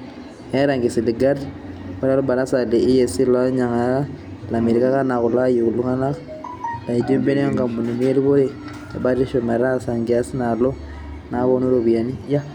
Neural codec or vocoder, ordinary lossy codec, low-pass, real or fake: none; none; 19.8 kHz; real